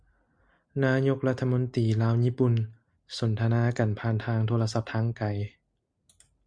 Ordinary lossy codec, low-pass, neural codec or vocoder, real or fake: Opus, 64 kbps; 9.9 kHz; none; real